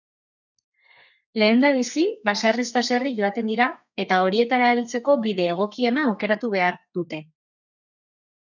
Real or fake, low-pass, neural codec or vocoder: fake; 7.2 kHz; codec, 32 kHz, 1.9 kbps, SNAC